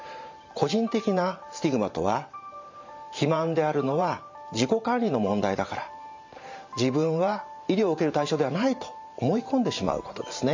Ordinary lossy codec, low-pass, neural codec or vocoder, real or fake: MP3, 64 kbps; 7.2 kHz; vocoder, 44.1 kHz, 128 mel bands every 512 samples, BigVGAN v2; fake